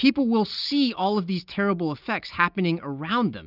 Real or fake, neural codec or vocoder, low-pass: real; none; 5.4 kHz